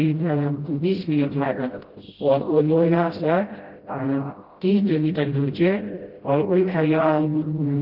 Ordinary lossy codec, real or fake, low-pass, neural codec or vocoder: Opus, 16 kbps; fake; 5.4 kHz; codec, 16 kHz, 0.5 kbps, FreqCodec, smaller model